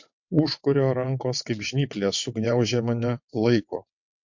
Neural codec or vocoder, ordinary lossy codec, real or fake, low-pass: vocoder, 22.05 kHz, 80 mel bands, WaveNeXt; MP3, 48 kbps; fake; 7.2 kHz